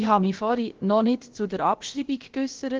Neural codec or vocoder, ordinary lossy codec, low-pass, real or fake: codec, 16 kHz, about 1 kbps, DyCAST, with the encoder's durations; Opus, 16 kbps; 7.2 kHz; fake